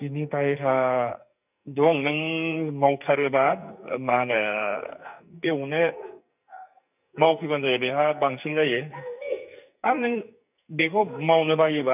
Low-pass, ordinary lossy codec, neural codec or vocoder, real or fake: 3.6 kHz; none; codec, 44.1 kHz, 2.6 kbps, SNAC; fake